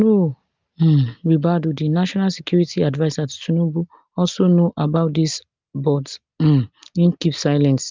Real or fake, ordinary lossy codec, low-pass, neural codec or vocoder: real; Opus, 24 kbps; 7.2 kHz; none